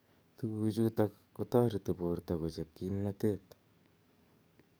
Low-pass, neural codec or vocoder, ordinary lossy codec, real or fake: none; codec, 44.1 kHz, 7.8 kbps, DAC; none; fake